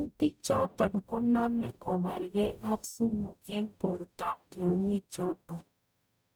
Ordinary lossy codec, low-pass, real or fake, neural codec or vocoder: none; none; fake; codec, 44.1 kHz, 0.9 kbps, DAC